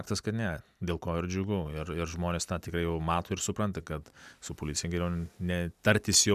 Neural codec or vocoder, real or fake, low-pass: none; real; 14.4 kHz